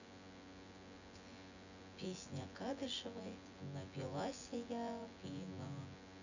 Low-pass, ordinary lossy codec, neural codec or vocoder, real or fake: 7.2 kHz; none; vocoder, 24 kHz, 100 mel bands, Vocos; fake